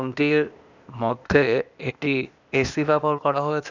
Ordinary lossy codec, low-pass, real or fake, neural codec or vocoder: none; 7.2 kHz; fake; codec, 16 kHz, 0.8 kbps, ZipCodec